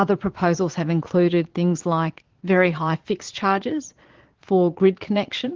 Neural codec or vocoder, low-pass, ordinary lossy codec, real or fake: vocoder, 22.05 kHz, 80 mel bands, Vocos; 7.2 kHz; Opus, 16 kbps; fake